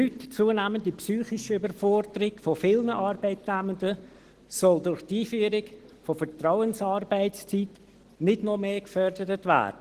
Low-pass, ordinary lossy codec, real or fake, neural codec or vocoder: 14.4 kHz; Opus, 16 kbps; real; none